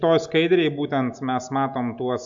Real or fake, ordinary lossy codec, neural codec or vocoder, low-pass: real; MP3, 64 kbps; none; 7.2 kHz